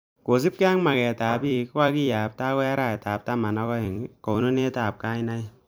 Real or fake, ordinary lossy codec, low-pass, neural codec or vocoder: fake; none; none; vocoder, 44.1 kHz, 128 mel bands every 256 samples, BigVGAN v2